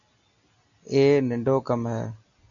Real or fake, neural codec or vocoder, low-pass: real; none; 7.2 kHz